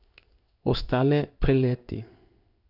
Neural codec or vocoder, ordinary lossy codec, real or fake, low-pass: codec, 16 kHz in and 24 kHz out, 1 kbps, XY-Tokenizer; none; fake; 5.4 kHz